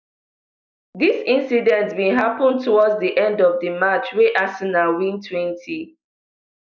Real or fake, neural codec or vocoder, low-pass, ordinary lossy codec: real; none; 7.2 kHz; none